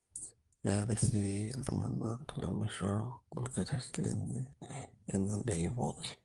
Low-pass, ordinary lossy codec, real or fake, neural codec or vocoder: 10.8 kHz; Opus, 32 kbps; fake; codec, 24 kHz, 1 kbps, SNAC